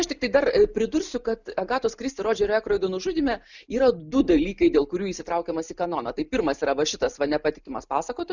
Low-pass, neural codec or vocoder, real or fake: 7.2 kHz; none; real